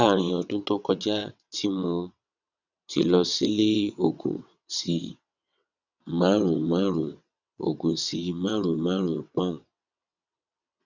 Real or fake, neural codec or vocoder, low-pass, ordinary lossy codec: fake; vocoder, 22.05 kHz, 80 mel bands, WaveNeXt; 7.2 kHz; none